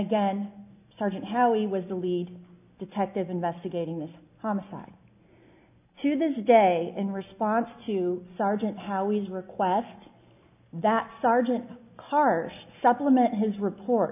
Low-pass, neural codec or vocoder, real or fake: 3.6 kHz; none; real